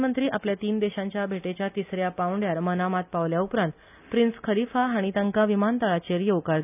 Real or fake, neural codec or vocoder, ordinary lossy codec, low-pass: real; none; none; 3.6 kHz